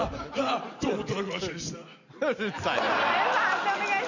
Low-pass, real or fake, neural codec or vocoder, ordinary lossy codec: 7.2 kHz; real; none; none